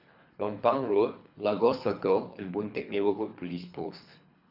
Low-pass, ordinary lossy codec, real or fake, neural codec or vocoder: 5.4 kHz; AAC, 48 kbps; fake; codec, 24 kHz, 3 kbps, HILCodec